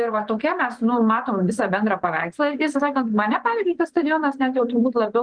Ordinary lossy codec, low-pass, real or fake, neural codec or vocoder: Opus, 24 kbps; 9.9 kHz; fake; vocoder, 24 kHz, 100 mel bands, Vocos